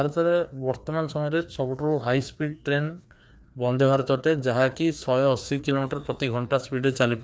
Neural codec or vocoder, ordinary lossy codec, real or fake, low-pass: codec, 16 kHz, 2 kbps, FreqCodec, larger model; none; fake; none